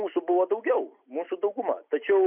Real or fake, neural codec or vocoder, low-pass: real; none; 3.6 kHz